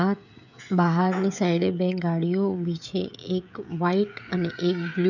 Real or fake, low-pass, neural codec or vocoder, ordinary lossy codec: fake; 7.2 kHz; codec, 16 kHz, 16 kbps, FreqCodec, smaller model; none